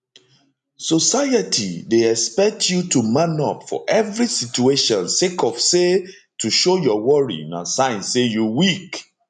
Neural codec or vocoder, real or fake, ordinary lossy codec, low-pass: none; real; none; 10.8 kHz